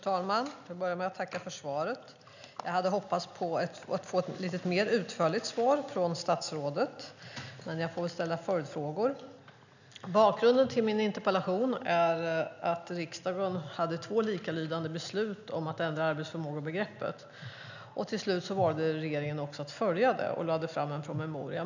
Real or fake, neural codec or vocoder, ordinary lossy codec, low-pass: real; none; none; 7.2 kHz